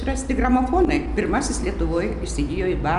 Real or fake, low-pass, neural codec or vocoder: real; 10.8 kHz; none